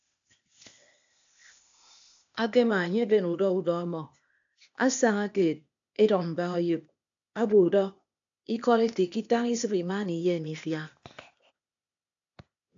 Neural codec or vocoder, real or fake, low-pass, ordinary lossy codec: codec, 16 kHz, 0.8 kbps, ZipCodec; fake; 7.2 kHz; AAC, 64 kbps